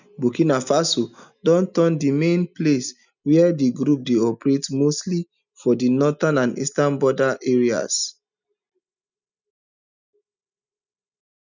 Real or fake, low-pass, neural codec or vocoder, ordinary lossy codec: real; 7.2 kHz; none; none